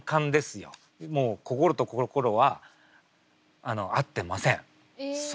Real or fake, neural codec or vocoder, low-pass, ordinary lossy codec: real; none; none; none